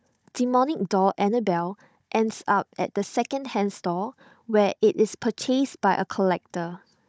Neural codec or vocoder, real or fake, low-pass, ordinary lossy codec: codec, 16 kHz, 16 kbps, FunCodec, trained on Chinese and English, 50 frames a second; fake; none; none